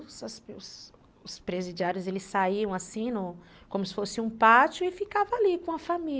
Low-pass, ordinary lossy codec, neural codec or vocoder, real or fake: none; none; none; real